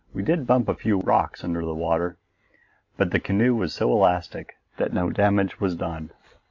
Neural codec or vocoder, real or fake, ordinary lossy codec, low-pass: none; real; AAC, 48 kbps; 7.2 kHz